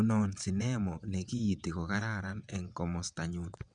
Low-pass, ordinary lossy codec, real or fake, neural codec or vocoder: 9.9 kHz; none; fake; vocoder, 22.05 kHz, 80 mel bands, WaveNeXt